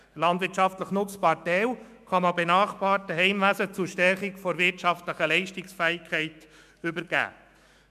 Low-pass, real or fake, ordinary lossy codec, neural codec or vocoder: 14.4 kHz; fake; MP3, 96 kbps; autoencoder, 48 kHz, 128 numbers a frame, DAC-VAE, trained on Japanese speech